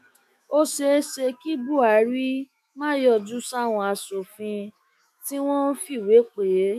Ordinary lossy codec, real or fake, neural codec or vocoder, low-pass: none; fake; autoencoder, 48 kHz, 128 numbers a frame, DAC-VAE, trained on Japanese speech; 14.4 kHz